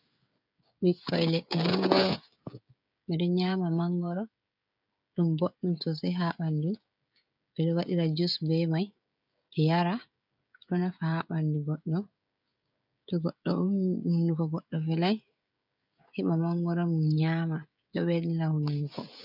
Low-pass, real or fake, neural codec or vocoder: 5.4 kHz; fake; codec, 16 kHz, 16 kbps, FreqCodec, smaller model